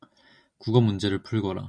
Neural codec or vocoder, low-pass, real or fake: none; 9.9 kHz; real